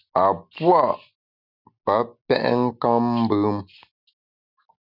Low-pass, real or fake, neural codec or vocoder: 5.4 kHz; real; none